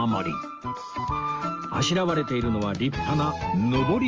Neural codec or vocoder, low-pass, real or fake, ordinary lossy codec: none; 7.2 kHz; real; Opus, 24 kbps